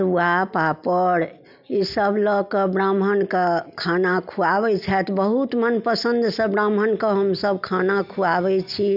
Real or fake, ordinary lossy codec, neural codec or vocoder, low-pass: real; none; none; 5.4 kHz